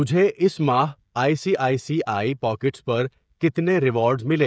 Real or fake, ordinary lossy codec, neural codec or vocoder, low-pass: fake; none; codec, 16 kHz, 16 kbps, FreqCodec, smaller model; none